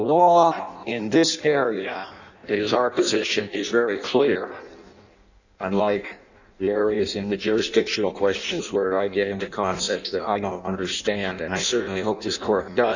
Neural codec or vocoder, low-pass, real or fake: codec, 16 kHz in and 24 kHz out, 0.6 kbps, FireRedTTS-2 codec; 7.2 kHz; fake